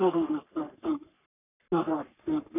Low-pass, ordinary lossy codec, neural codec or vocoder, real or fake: 3.6 kHz; none; codec, 44.1 kHz, 3.4 kbps, Pupu-Codec; fake